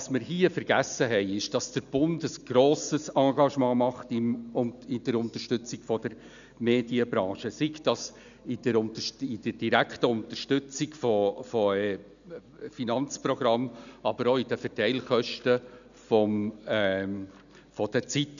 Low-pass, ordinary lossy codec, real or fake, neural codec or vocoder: 7.2 kHz; none; real; none